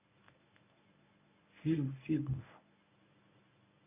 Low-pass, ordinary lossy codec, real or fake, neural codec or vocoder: 3.6 kHz; AAC, 32 kbps; fake; codec, 24 kHz, 0.9 kbps, WavTokenizer, medium speech release version 1